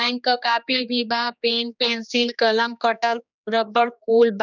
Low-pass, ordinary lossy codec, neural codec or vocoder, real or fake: 7.2 kHz; none; codec, 16 kHz, 2 kbps, X-Codec, HuBERT features, trained on general audio; fake